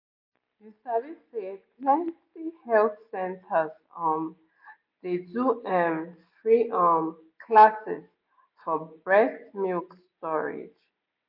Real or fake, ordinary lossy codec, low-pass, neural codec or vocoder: real; MP3, 32 kbps; 5.4 kHz; none